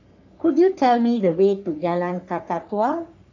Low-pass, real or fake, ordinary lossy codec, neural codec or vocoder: 7.2 kHz; fake; AAC, 48 kbps; codec, 44.1 kHz, 3.4 kbps, Pupu-Codec